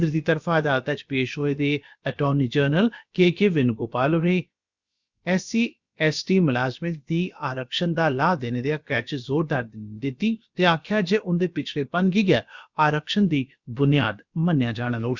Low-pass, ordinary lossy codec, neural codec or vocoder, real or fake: 7.2 kHz; Opus, 64 kbps; codec, 16 kHz, about 1 kbps, DyCAST, with the encoder's durations; fake